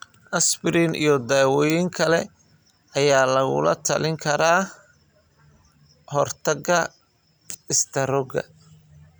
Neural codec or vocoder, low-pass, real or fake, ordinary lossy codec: none; none; real; none